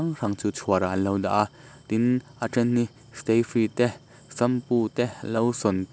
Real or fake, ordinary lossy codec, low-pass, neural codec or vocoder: real; none; none; none